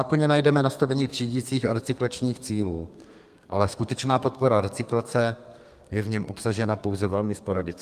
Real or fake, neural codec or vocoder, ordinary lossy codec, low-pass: fake; codec, 32 kHz, 1.9 kbps, SNAC; Opus, 32 kbps; 14.4 kHz